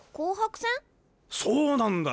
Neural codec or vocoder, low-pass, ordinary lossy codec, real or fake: none; none; none; real